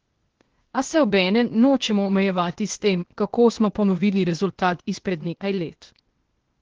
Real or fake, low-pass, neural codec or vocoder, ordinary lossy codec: fake; 7.2 kHz; codec, 16 kHz, 0.8 kbps, ZipCodec; Opus, 16 kbps